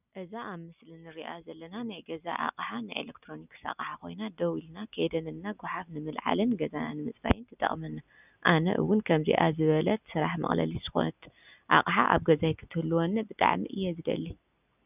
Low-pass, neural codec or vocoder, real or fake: 3.6 kHz; none; real